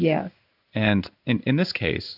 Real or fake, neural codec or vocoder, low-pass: fake; codec, 16 kHz in and 24 kHz out, 1 kbps, XY-Tokenizer; 5.4 kHz